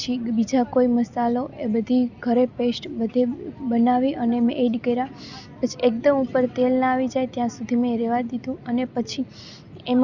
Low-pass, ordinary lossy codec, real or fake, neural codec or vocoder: 7.2 kHz; Opus, 64 kbps; real; none